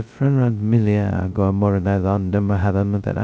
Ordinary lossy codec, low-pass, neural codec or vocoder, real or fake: none; none; codec, 16 kHz, 0.2 kbps, FocalCodec; fake